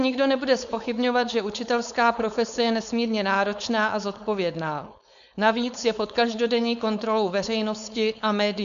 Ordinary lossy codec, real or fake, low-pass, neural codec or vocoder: MP3, 96 kbps; fake; 7.2 kHz; codec, 16 kHz, 4.8 kbps, FACodec